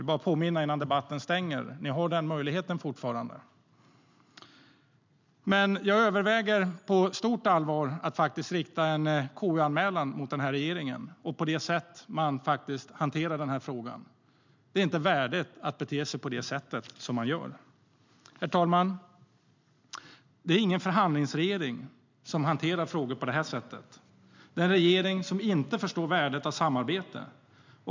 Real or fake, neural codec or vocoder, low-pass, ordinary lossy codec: real; none; 7.2 kHz; MP3, 64 kbps